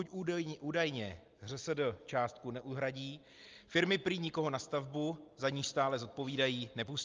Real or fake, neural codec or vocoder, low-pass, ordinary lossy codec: real; none; 7.2 kHz; Opus, 24 kbps